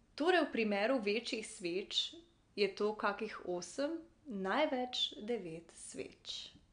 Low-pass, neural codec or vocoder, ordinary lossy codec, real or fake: 9.9 kHz; none; MP3, 64 kbps; real